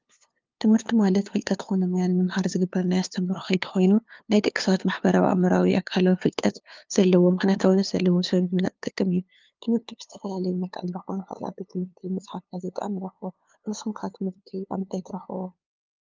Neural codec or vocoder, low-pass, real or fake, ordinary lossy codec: codec, 16 kHz, 2 kbps, FunCodec, trained on LibriTTS, 25 frames a second; 7.2 kHz; fake; Opus, 24 kbps